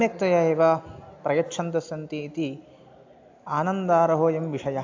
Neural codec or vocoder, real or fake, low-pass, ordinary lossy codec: none; real; 7.2 kHz; AAC, 48 kbps